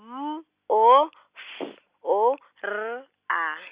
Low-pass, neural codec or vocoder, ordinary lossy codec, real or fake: 3.6 kHz; none; Opus, 64 kbps; real